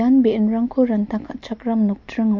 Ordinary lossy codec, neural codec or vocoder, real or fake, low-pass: MP3, 48 kbps; none; real; 7.2 kHz